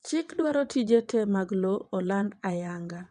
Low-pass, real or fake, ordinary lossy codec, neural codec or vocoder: 9.9 kHz; fake; none; vocoder, 22.05 kHz, 80 mel bands, WaveNeXt